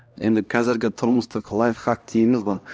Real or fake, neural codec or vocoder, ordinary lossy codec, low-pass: fake; codec, 16 kHz, 1 kbps, X-Codec, HuBERT features, trained on LibriSpeech; none; none